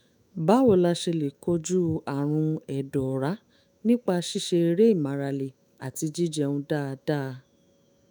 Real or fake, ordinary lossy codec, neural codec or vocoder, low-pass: fake; none; autoencoder, 48 kHz, 128 numbers a frame, DAC-VAE, trained on Japanese speech; none